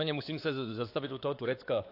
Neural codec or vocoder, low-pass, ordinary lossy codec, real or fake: codec, 16 kHz, 4 kbps, X-Codec, WavLM features, trained on Multilingual LibriSpeech; 5.4 kHz; AAC, 32 kbps; fake